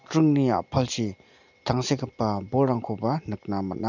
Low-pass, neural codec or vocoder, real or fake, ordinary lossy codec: 7.2 kHz; none; real; none